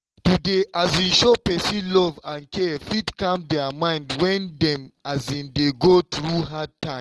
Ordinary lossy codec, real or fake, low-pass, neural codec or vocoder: Opus, 16 kbps; real; 10.8 kHz; none